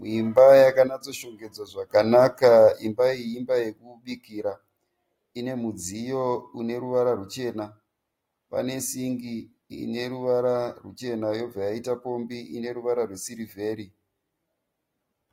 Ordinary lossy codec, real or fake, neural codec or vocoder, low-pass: AAC, 48 kbps; real; none; 19.8 kHz